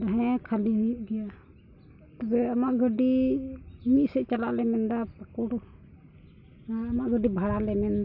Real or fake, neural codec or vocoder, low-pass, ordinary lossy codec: real; none; 5.4 kHz; none